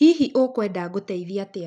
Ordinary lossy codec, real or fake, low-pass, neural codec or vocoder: none; real; none; none